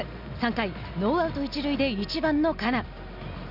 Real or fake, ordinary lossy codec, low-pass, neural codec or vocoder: real; none; 5.4 kHz; none